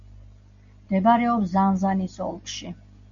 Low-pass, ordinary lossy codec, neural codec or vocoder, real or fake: 7.2 kHz; MP3, 96 kbps; none; real